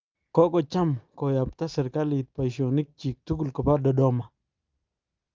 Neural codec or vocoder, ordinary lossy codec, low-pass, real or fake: none; Opus, 32 kbps; 7.2 kHz; real